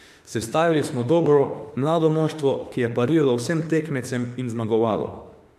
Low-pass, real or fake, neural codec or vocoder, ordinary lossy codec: 14.4 kHz; fake; autoencoder, 48 kHz, 32 numbers a frame, DAC-VAE, trained on Japanese speech; MP3, 96 kbps